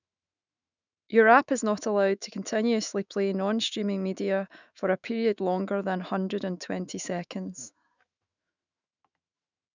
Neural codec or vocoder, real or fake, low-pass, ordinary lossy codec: autoencoder, 48 kHz, 128 numbers a frame, DAC-VAE, trained on Japanese speech; fake; 7.2 kHz; none